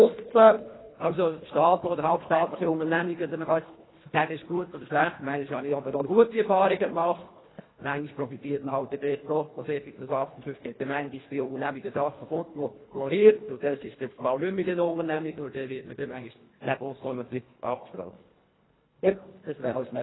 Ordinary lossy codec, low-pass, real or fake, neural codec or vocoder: AAC, 16 kbps; 7.2 kHz; fake; codec, 24 kHz, 1.5 kbps, HILCodec